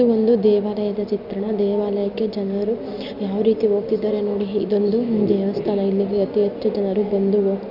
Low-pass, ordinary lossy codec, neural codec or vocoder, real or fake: 5.4 kHz; none; none; real